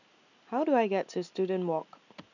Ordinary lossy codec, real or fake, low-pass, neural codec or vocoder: none; real; 7.2 kHz; none